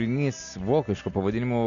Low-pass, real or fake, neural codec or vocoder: 7.2 kHz; real; none